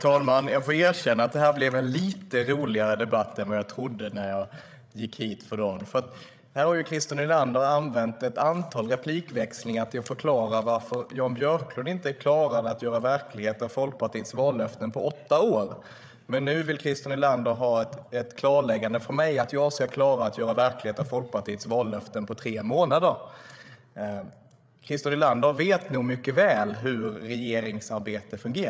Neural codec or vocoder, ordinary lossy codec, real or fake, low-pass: codec, 16 kHz, 8 kbps, FreqCodec, larger model; none; fake; none